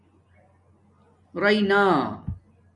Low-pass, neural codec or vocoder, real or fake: 10.8 kHz; none; real